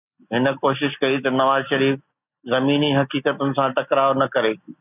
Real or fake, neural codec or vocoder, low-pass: real; none; 3.6 kHz